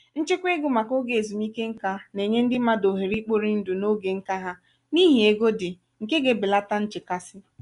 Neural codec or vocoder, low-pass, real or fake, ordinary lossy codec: none; 10.8 kHz; real; none